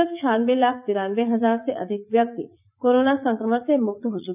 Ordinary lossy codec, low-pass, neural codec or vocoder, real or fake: none; 3.6 kHz; vocoder, 44.1 kHz, 80 mel bands, Vocos; fake